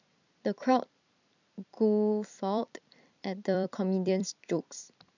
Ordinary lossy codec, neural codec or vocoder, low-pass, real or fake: none; vocoder, 44.1 kHz, 128 mel bands every 256 samples, BigVGAN v2; 7.2 kHz; fake